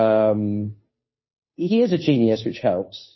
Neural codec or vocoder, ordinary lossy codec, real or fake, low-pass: codec, 16 kHz, 2 kbps, FunCodec, trained on Chinese and English, 25 frames a second; MP3, 24 kbps; fake; 7.2 kHz